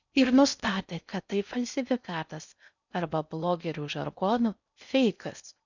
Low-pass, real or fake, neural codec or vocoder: 7.2 kHz; fake; codec, 16 kHz in and 24 kHz out, 0.6 kbps, FocalCodec, streaming, 4096 codes